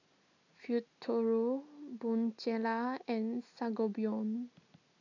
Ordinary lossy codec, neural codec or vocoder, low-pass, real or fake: none; none; 7.2 kHz; real